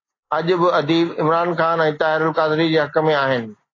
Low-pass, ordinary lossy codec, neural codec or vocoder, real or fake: 7.2 kHz; AAC, 32 kbps; none; real